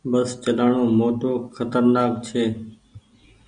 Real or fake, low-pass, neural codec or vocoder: real; 9.9 kHz; none